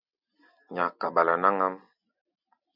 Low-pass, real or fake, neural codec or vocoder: 5.4 kHz; real; none